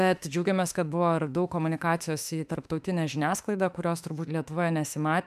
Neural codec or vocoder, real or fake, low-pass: autoencoder, 48 kHz, 32 numbers a frame, DAC-VAE, trained on Japanese speech; fake; 14.4 kHz